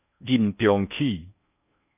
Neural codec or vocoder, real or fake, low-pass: codec, 16 kHz in and 24 kHz out, 0.6 kbps, FocalCodec, streaming, 4096 codes; fake; 3.6 kHz